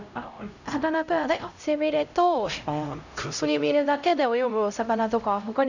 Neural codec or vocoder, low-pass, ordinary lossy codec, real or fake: codec, 16 kHz, 0.5 kbps, X-Codec, HuBERT features, trained on LibriSpeech; 7.2 kHz; none; fake